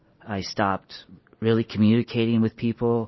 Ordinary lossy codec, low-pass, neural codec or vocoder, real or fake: MP3, 24 kbps; 7.2 kHz; none; real